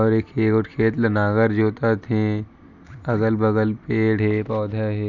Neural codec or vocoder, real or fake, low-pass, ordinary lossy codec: none; real; 7.2 kHz; none